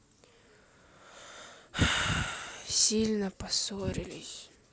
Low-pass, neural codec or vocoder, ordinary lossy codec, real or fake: none; none; none; real